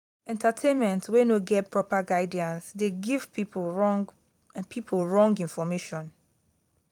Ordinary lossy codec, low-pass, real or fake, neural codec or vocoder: none; 19.8 kHz; fake; vocoder, 44.1 kHz, 128 mel bands every 256 samples, BigVGAN v2